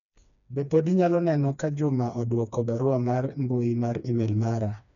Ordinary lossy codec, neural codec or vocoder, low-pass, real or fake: none; codec, 16 kHz, 2 kbps, FreqCodec, smaller model; 7.2 kHz; fake